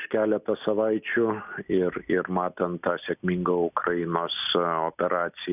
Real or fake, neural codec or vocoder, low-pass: real; none; 3.6 kHz